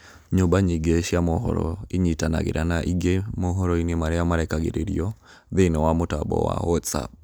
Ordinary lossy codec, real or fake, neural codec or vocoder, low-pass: none; real; none; none